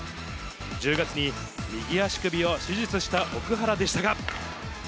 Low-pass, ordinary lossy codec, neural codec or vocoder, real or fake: none; none; none; real